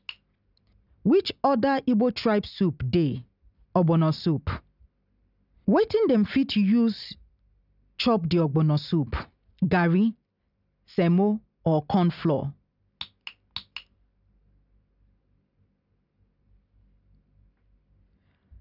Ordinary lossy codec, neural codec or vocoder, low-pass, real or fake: none; none; 5.4 kHz; real